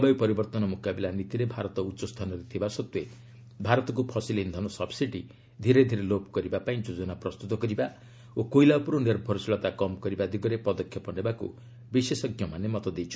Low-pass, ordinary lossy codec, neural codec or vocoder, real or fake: none; none; none; real